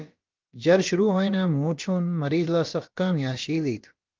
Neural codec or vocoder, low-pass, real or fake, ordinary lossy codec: codec, 16 kHz, about 1 kbps, DyCAST, with the encoder's durations; 7.2 kHz; fake; Opus, 24 kbps